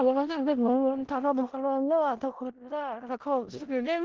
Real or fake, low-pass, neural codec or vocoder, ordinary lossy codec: fake; 7.2 kHz; codec, 16 kHz in and 24 kHz out, 0.4 kbps, LongCat-Audio-Codec, four codebook decoder; Opus, 16 kbps